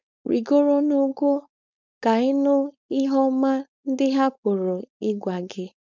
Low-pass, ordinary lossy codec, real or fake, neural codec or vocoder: 7.2 kHz; none; fake; codec, 16 kHz, 4.8 kbps, FACodec